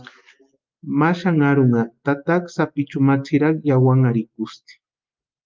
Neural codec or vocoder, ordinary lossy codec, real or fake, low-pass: none; Opus, 24 kbps; real; 7.2 kHz